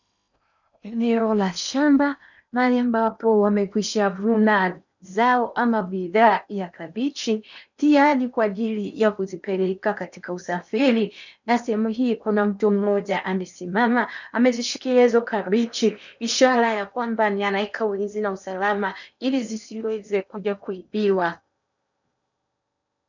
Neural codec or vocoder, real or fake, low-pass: codec, 16 kHz in and 24 kHz out, 0.8 kbps, FocalCodec, streaming, 65536 codes; fake; 7.2 kHz